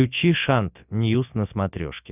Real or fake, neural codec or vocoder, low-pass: real; none; 3.6 kHz